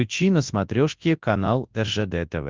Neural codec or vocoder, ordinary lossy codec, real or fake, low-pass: codec, 24 kHz, 0.9 kbps, WavTokenizer, large speech release; Opus, 32 kbps; fake; 7.2 kHz